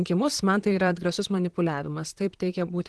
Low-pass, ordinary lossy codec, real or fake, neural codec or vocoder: 10.8 kHz; Opus, 16 kbps; fake; vocoder, 44.1 kHz, 128 mel bands, Pupu-Vocoder